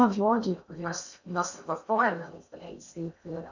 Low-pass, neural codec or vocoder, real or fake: 7.2 kHz; codec, 16 kHz in and 24 kHz out, 0.6 kbps, FocalCodec, streaming, 2048 codes; fake